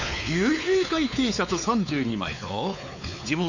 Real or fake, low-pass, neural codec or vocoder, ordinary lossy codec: fake; 7.2 kHz; codec, 16 kHz, 4 kbps, X-Codec, WavLM features, trained on Multilingual LibriSpeech; none